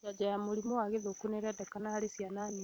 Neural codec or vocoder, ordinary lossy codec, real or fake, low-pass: vocoder, 44.1 kHz, 128 mel bands every 256 samples, BigVGAN v2; none; fake; 19.8 kHz